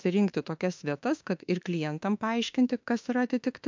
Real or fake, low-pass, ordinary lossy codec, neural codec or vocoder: fake; 7.2 kHz; MP3, 64 kbps; autoencoder, 48 kHz, 32 numbers a frame, DAC-VAE, trained on Japanese speech